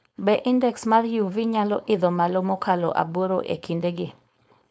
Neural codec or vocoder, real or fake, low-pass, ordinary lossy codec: codec, 16 kHz, 4.8 kbps, FACodec; fake; none; none